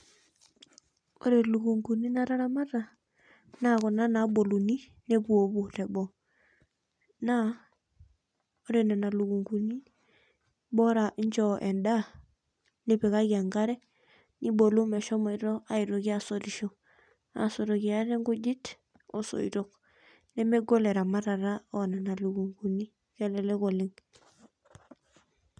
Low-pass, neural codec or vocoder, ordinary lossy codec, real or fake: 9.9 kHz; none; none; real